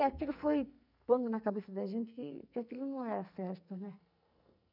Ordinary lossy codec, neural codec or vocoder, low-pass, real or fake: none; codec, 44.1 kHz, 2.6 kbps, SNAC; 5.4 kHz; fake